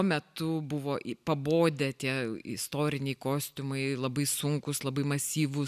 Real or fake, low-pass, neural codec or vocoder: real; 14.4 kHz; none